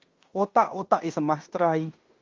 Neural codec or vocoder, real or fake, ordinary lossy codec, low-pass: codec, 16 kHz in and 24 kHz out, 0.9 kbps, LongCat-Audio-Codec, fine tuned four codebook decoder; fake; Opus, 32 kbps; 7.2 kHz